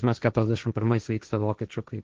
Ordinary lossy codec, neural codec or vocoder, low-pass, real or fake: Opus, 16 kbps; codec, 16 kHz, 1.1 kbps, Voila-Tokenizer; 7.2 kHz; fake